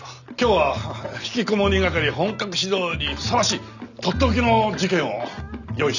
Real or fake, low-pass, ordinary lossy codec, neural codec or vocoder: real; 7.2 kHz; none; none